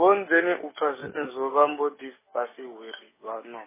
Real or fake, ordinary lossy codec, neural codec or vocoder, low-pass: real; MP3, 16 kbps; none; 3.6 kHz